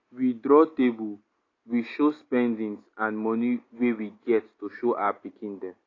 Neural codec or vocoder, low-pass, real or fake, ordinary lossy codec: none; 7.2 kHz; real; AAC, 32 kbps